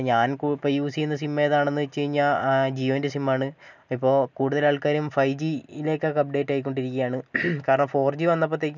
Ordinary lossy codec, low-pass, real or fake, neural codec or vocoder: none; 7.2 kHz; real; none